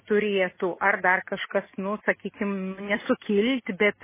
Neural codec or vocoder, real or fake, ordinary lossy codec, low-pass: none; real; MP3, 16 kbps; 3.6 kHz